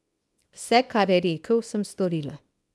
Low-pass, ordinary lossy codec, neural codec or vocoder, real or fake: none; none; codec, 24 kHz, 0.9 kbps, WavTokenizer, small release; fake